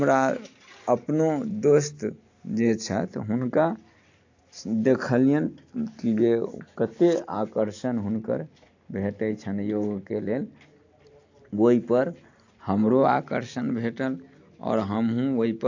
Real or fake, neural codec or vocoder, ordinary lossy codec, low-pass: real; none; AAC, 48 kbps; 7.2 kHz